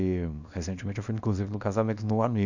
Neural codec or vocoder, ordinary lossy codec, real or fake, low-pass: codec, 24 kHz, 0.9 kbps, WavTokenizer, small release; none; fake; 7.2 kHz